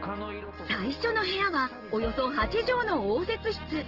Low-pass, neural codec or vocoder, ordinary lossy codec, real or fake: 5.4 kHz; none; Opus, 16 kbps; real